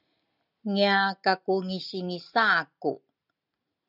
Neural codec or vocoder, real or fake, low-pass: none; real; 5.4 kHz